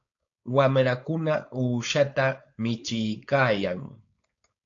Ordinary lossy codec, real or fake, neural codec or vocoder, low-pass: AAC, 48 kbps; fake; codec, 16 kHz, 4.8 kbps, FACodec; 7.2 kHz